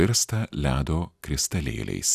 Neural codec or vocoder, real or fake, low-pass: none; real; 14.4 kHz